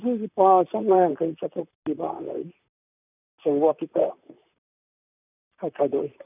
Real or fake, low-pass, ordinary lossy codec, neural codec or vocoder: fake; 3.6 kHz; none; vocoder, 44.1 kHz, 128 mel bands, Pupu-Vocoder